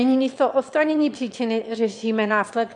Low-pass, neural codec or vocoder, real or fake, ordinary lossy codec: 9.9 kHz; autoencoder, 22.05 kHz, a latent of 192 numbers a frame, VITS, trained on one speaker; fake; AAC, 64 kbps